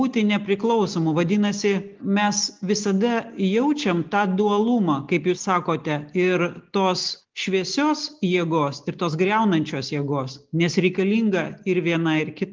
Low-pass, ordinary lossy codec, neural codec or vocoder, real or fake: 7.2 kHz; Opus, 32 kbps; none; real